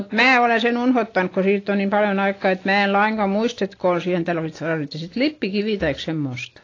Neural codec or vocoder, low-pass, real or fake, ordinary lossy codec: none; 7.2 kHz; real; AAC, 32 kbps